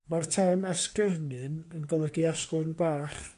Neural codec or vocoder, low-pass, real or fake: codec, 24 kHz, 1 kbps, SNAC; 10.8 kHz; fake